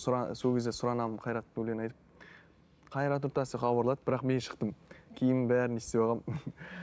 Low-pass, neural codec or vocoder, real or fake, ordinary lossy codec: none; none; real; none